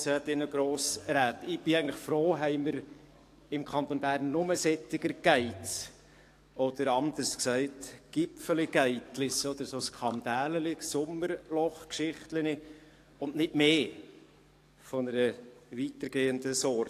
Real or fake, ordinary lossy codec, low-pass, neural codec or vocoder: fake; AAC, 64 kbps; 14.4 kHz; codec, 44.1 kHz, 7.8 kbps, DAC